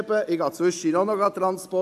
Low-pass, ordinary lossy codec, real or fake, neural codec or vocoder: 14.4 kHz; AAC, 96 kbps; real; none